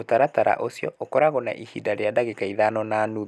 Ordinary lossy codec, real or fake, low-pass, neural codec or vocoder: none; real; none; none